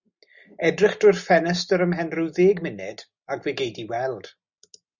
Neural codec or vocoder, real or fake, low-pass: none; real; 7.2 kHz